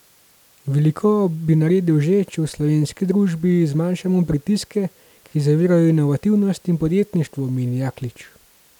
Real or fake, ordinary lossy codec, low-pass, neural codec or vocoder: real; none; 19.8 kHz; none